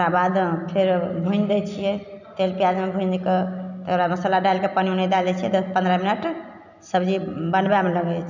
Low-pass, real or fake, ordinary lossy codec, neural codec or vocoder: 7.2 kHz; real; none; none